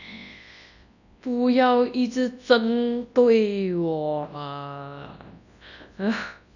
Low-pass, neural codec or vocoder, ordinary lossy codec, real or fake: 7.2 kHz; codec, 24 kHz, 0.9 kbps, WavTokenizer, large speech release; none; fake